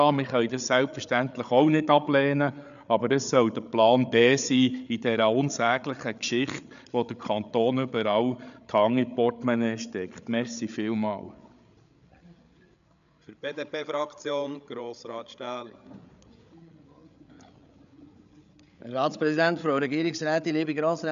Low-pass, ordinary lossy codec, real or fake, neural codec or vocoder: 7.2 kHz; none; fake; codec, 16 kHz, 8 kbps, FreqCodec, larger model